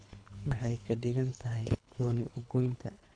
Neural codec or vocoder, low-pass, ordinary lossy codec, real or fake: codec, 24 kHz, 3 kbps, HILCodec; 9.9 kHz; none; fake